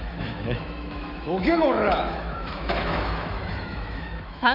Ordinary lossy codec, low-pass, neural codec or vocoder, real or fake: none; 5.4 kHz; autoencoder, 48 kHz, 128 numbers a frame, DAC-VAE, trained on Japanese speech; fake